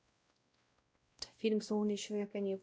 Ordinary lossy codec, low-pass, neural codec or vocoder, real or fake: none; none; codec, 16 kHz, 0.5 kbps, X-Codec, WavLM features, trained on Multilingual LibriSpeech; fake